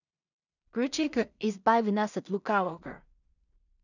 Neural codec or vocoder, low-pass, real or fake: codec, 16 kHz in and 24 kHz out, 0.4 kbps, LongCat-Audio-Codec, two codebook decoder; 7.2 kHz; fake